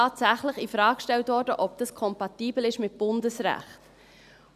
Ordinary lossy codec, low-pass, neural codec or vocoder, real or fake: none; 14.4 kHz; none; real